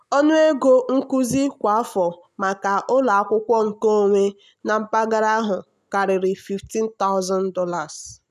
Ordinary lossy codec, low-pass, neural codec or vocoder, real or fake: none; 14.4 kHz; none; real